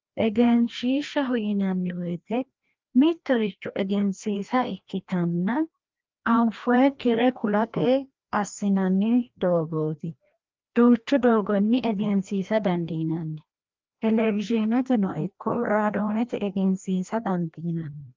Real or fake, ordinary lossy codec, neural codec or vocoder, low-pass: fake; Opus, 32 kbps; codec, 16 kHz, 1 kbps, FreqCodec, larger model; 7.2 kHz